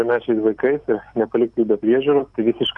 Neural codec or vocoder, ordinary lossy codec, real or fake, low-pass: none; MP3, 96 kbps; real; 10.8 kHz